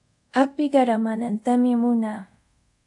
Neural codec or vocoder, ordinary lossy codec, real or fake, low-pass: codec, 24 kHz, 0.5 kbps, DualCodec; AAC, 64 kbps; fake; 10.8 kHz